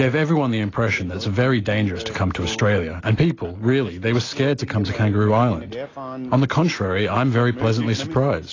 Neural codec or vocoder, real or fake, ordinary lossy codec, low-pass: none; real; AAC, 32 kbps; 7.2 kHz